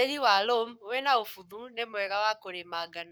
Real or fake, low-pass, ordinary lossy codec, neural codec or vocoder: fake; none; none; codec, 44.1 kHz, 7.8 kbps, Pupu-Codec